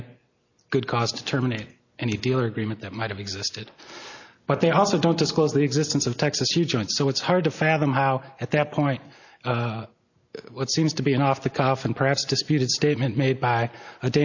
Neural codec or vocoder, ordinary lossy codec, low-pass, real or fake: none; AAC, 48 kbps; 7.2 kHz; real